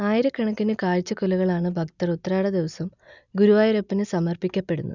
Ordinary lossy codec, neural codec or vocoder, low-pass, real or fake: none; none; 7.2 kHz; real